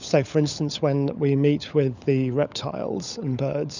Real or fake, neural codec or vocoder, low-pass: fake; codec, 16 kHz, 16 kbps, FunCodec, trained on LibriTTS, 50 frames a second; 7.2 kHz